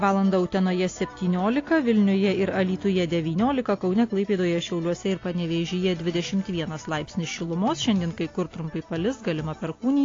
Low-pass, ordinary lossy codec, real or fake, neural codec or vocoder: 7.2 kHz; AAC, 32 kbps; real; none